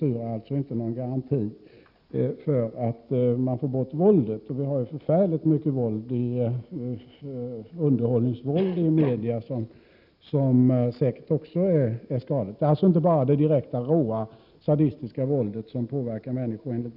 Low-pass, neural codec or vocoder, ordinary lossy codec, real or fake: 5.4 kHz; none; none; real